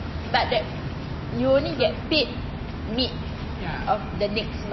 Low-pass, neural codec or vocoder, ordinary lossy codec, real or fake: 7.2 kHz; none; MP3, 24 kbps; real